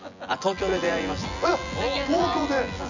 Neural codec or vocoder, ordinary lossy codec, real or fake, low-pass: vocoder, 24 kHz, 100 mel bands, Vocos; none; fake; 7.2 kHz